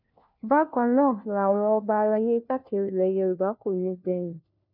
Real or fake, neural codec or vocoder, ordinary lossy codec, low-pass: fake; codec, 16 kHz, 1 kbps, FunCodec, trained on LibriTTS, 50 frames a second; none; 5.4 kHz